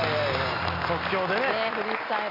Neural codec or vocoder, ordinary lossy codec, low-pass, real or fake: none; AAC, 32 kbps; 5.4 kHz; real